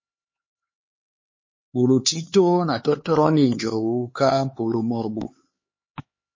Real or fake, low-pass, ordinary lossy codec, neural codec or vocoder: fake; 7.2 kHz; MP3, 32 kbps; codec, 16 kHz, 4 kbps, X-Codec, HuBERT features, trained on LibriSpeech